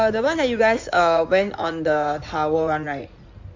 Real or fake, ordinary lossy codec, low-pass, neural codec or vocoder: fake; MP3, 64 kbps; 7.2 kHz; codec, 16 kHz in and 24 kHz out, 2.2 kbps, FireRedTTS-2 codec